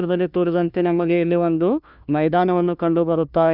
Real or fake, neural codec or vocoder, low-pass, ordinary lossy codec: fake; codec, 16 kHz, 1 kbps, FunCodec, trained on LibriTTS, 50 frames a second; 5.4 kHz; none